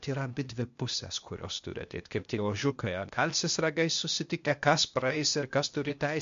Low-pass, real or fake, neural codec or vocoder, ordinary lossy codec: 7.2 kHz; fake; codec, 16 kHz, 0.8 kbps, ZipCodec; MP3, 48 kbps